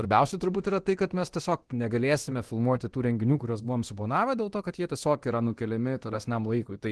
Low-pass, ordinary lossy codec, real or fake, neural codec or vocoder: 10.8 kHz; Opus, 16 kbps; fake; codec, 24 kHz, 0.9 kbps, DualCodec